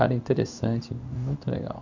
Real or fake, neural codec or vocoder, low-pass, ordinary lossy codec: fake; codec, 16 kHz in and 24 kHz out, 1 kbps, XY-Tokenizer; 7.2 kHz; none